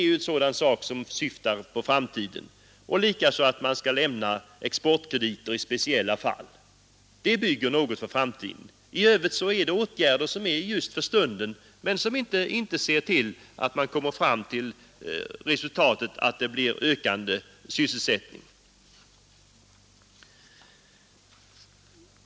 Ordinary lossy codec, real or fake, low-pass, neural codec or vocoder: none; real; none; none